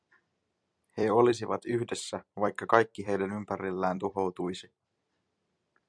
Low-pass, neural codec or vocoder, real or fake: 9.9 kHz; vocoder, 44.1 kHz, 128 mel bands every 256 samples, BigVGAN v2; fake